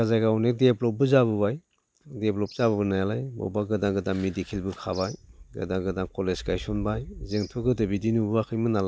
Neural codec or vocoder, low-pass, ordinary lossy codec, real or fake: none; none; none; real